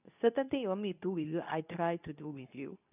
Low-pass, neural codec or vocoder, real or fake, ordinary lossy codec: 3.6 kHz; codec, 24 kHz, 0.9 kbps, WavTokenizer, medium speech release version 2; fake; none